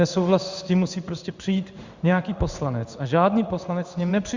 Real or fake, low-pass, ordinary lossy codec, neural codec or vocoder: fake; 7.2 kHz; Opus, 64 kbps; codec, 16 kHz in and 24 kHz out, 1 kbps, XY-Tokenizer